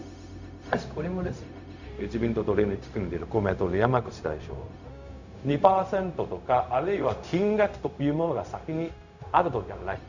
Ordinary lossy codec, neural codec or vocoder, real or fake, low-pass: none; codec, 16 kHz, 0.4 kbps, LongCat-Audio-Codec; fake; 7.2 kHz